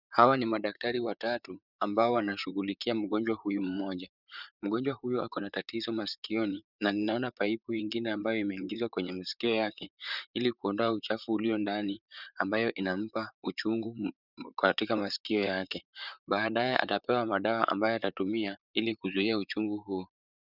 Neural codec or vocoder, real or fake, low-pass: vocoder, 44.1 kHz, 128 mel bands, Pupu-Vocoder; fake; 5.4 kHz